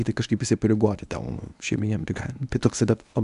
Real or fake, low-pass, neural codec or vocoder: fake; 10.8 kHz; codec, 24 kHz, 0.9 kbps, WavTokenizer, medium speech release version 1